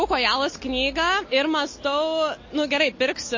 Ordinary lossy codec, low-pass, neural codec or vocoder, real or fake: MP3, 32 kbps; 7.2 kHz; none; real